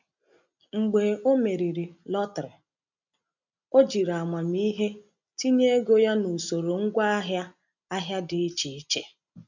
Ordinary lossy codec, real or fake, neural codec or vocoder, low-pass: none; real; none; 7.2 kHz